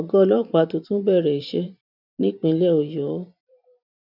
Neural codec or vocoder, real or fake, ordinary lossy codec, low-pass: vocoder, 44.1 kHz, 128 mel bands every 512 samples, BigVGAN v2; fake; none; 5.4 kHz